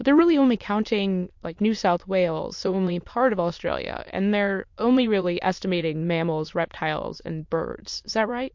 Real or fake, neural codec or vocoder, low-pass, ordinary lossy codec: fake; autoencoder, 22.05 kHz, a latent of 192 numbers a frame, VITS, trained on many speakers; 7.2 kHz; MP3, 48 kbps